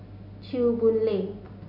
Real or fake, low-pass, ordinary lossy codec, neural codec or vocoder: real; 5.4 kHz; none; none